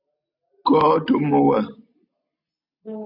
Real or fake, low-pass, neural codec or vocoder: real; 5.4 kHz; none